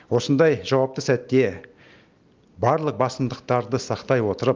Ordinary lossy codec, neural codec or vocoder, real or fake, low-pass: Opus, 24 kbps; none; real; 7.2 kHz